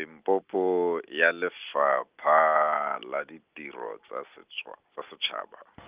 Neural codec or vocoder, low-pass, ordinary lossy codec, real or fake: none; 3.6 kHz; Opus, 24 kbps; real